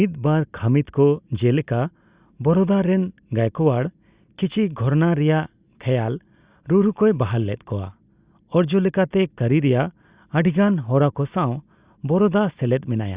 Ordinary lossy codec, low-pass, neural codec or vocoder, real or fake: Opus, 64 kbps; 3.6 kHz; none; real